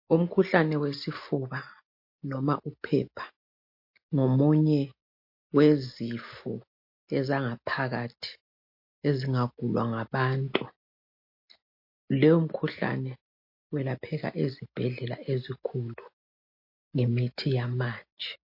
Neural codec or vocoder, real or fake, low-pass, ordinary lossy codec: none; real; 5.4 kHz; MP3, 32 kbps